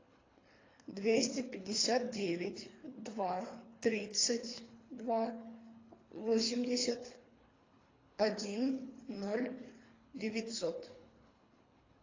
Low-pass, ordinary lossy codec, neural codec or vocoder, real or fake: 7.2 kHz; AAC, 32 kbps; codec, 24 kHz, 3 kbps, HILCodec; fake